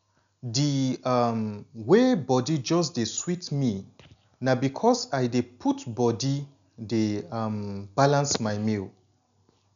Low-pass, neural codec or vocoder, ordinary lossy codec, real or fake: 7.2 kHz; none; none; real